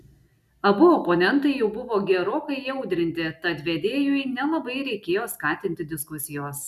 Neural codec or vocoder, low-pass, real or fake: none; 14.4 kHz; real